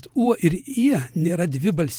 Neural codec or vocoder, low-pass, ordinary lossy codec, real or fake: vocoder, 48 kHz, 128 mel bands, Vocos; 19.8 kHz; Opus, 24 kbps; fake